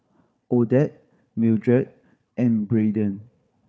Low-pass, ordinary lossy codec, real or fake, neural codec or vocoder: none; none; fake; codec, 16 kHz, 4 kbps, FunCodec, trained on Chinese and English, 50 frames a second